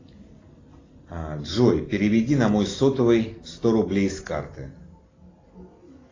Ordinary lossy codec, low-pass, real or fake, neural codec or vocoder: AAC, 32 kbps; 7.2 kHz; real; none